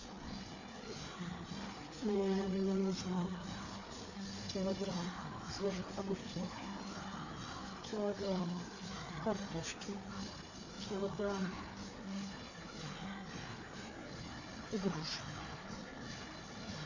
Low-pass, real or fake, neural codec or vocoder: 7.2 kHz; fake; codec, 16 kHz, 4 kbps, FreqCodec, larger model